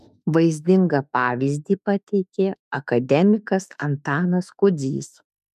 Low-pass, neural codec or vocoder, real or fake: 14.4 kHz; autoencoder, 48 kHz, 32 numbers a frame, DAC-VAE, trained on Japanese speech; fake